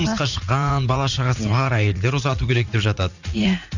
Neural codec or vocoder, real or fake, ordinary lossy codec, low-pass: vocoder, 44.1 kHz, 80 mel bands, Vocos; fake; none; 7.2 kHz